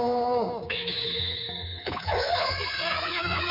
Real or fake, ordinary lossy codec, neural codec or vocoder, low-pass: fake; none; codec, 24 kHz, 3.1 kbps, DualCodec; 5.4 kHz